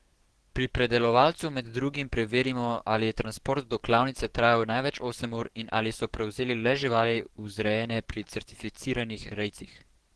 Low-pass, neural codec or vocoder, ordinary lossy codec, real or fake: 10.8 kHz; codec, 44.1 kHz, 7.8 kbps, DAC; Opus, 16 kbps; fake